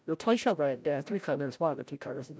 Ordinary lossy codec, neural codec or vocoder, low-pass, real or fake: none; codec, 16 kHz, 0.5 kbps, FreqCodec, larger model; none; fake